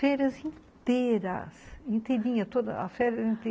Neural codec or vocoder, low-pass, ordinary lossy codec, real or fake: none; none; none; real